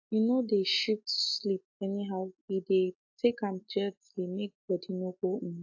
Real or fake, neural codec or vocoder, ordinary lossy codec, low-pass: real; none; AAC, 48 kbps; 7.2 kHz